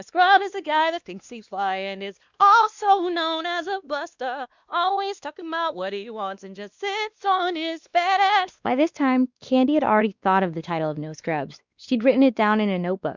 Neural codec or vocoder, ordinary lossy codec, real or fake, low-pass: codec, 16 kHz, 2 kbps, X-Codec, WavLM features, trained on Multilingual LibriSpeech; Opus, 64 kbps; fake; 7.2 kHz